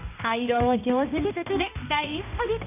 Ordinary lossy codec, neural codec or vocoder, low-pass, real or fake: none; codec, 16 kHz, 1 kbps, X-Codec, HuBERT features, trained on balanced general audio; 3.6 kHz; fake